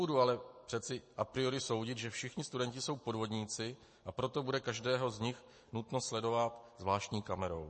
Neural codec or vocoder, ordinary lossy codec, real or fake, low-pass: none; MP3, 32 kbps; real; 9.9 kHz